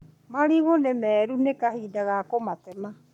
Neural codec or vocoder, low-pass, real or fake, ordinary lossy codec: codec, 44.1 kHz, 7.8 kbps, Pupu-Codec; 19.8 kHz; fake; none